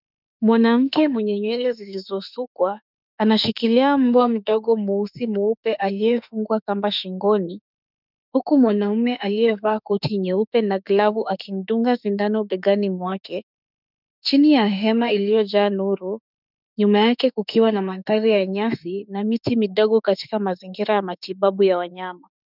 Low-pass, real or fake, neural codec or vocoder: 5.4 kHz; fake; autoencoder, 48 kHz, 32 numbers a frame, DAC-VAE, trained on Japanese speech